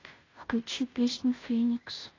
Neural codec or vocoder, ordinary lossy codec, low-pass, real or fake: codec, 16 kHz, 0.5 kbps, FunCodec, trained on Chinese and English, 25 frames a second; AAC, 32 kbps; 7.2 kHz; fake